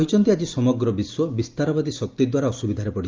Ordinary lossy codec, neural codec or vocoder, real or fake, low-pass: Opus, 24 kbps; none; real; 7.2 kHz